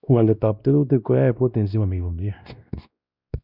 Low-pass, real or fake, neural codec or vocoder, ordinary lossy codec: 5.4 kHz; fake; codec, 16 kHz, 1 kbps, X-Codec, WavLM features, trained on Multilingual LibriSpeech; none